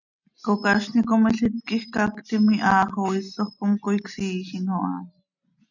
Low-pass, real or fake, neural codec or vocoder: 7.2 kHz; real; none